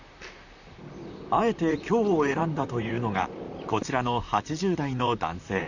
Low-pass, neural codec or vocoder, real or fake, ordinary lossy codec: 7.2 kHz; vocoder, 44.1 kHz, 128 mel bands, Pupu-Vocoder; fake; none